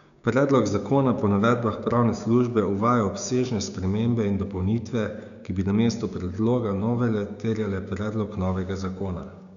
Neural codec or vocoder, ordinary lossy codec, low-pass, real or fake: codec, 16 kHz, 6 kbps, DAC; none; 7.2 kHz; fake